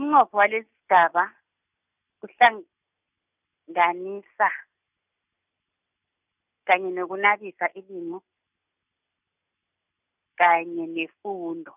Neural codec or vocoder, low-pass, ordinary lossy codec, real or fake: none; 3.6 kHz; none; real